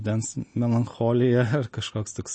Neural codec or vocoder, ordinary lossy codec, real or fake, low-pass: none; MP3, 32 kbps; real; 9.9 kHz